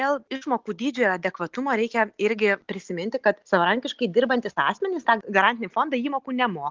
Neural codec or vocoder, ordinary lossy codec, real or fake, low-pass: none; Opus, 24 kbps; real; 7.2 kHz